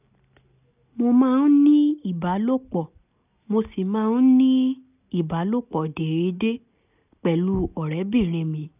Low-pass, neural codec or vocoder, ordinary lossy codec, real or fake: 3.6 kHz; none; none; real